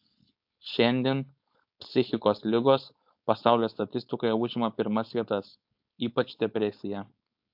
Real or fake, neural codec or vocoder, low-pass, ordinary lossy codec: fake; codec, 16 kHz, 4.8 kbps, FACodec; 5.4 kHz; AAC, 48 kbps